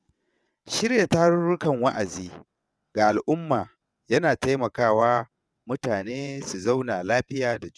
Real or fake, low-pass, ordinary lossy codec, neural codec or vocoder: fake; none; none; vocoder, 22.05 kHz, 80 mel bands, WaveNeXt